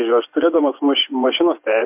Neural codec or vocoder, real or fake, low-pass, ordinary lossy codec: vocoder, 24 kHz, 100 mel bands, Vocos; fake; 3.6 kHz; MP3, 32 kbps